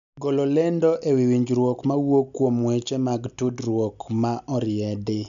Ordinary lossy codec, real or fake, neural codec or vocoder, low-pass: none; real; none; 7.2 kHz